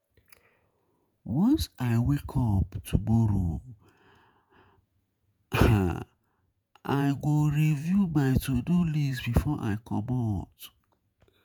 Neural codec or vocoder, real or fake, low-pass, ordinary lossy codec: vocoder, 48 kHz, 128 mel bands, Vocos; fake; none; none